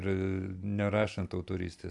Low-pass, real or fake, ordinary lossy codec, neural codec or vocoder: 10.8 kHz; real; AAC, 64 kbps; none